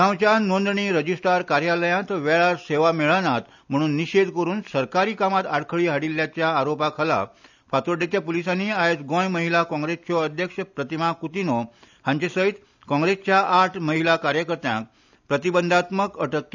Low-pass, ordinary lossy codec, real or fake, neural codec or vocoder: 7.2 kHz; none; real; none